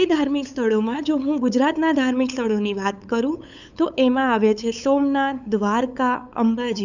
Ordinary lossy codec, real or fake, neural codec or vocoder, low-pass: none; fake; codec, 16 kHz, 8 kbps, FunCodec, trained on LibriTTS, 25 frames a second; 7.2 kHz